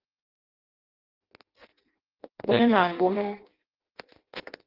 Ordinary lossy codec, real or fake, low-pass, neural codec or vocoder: Opus, 16 kbps; fake; 5.4 kHz; codec, 16 kHz in and 24 kHz out, 0.6 kbps, FireRedTTS-2 codec